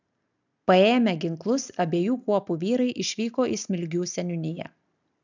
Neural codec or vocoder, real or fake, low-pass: none; real; 7.2 kHz